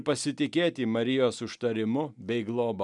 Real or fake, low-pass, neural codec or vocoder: real; 10.8 kHz; none